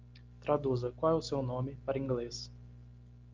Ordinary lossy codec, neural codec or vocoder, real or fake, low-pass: Opus, 32 kbps; none; real; 7.2 kHz